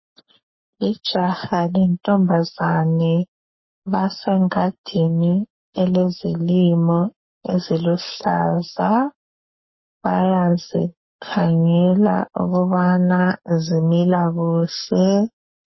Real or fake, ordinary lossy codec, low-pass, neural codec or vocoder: fake; MP3, 24 kbps; 7.2 kHz; codec, 44.1 kHz, 7.8 kbps, Pupu-Codec